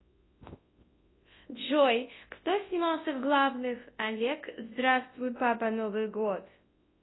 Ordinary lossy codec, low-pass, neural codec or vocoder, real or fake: AAC, 16 kbps; 7.2 kHz; codec, 24 kHz, 0.9 kbps, WavTokenizer, large speech release; fake